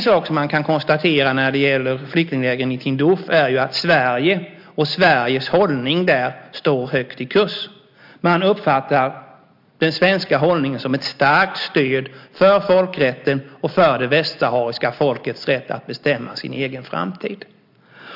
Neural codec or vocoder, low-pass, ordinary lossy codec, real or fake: none; 5.4 kHz; MP3, 48 kbps; real